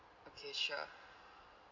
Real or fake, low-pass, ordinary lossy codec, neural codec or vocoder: real; 7.2 kHz; none; none